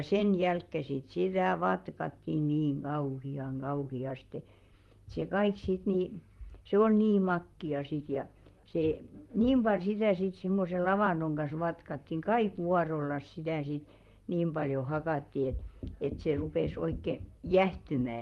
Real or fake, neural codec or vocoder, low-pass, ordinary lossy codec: fake; vocoder, 44.1 kHz, 128 mel bands, Pupu-Vocoder; 19.8 kHz; Opus, 32 kbps